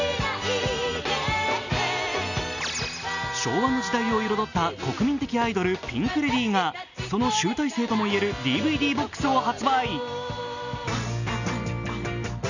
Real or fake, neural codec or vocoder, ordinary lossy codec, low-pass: real; none; none; 7.2 kHz